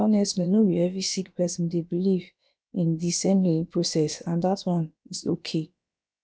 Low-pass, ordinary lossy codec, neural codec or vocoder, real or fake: none; none; codec, 16 kHz, about 1 kbps, DyCAST, with the encoder's durations; fake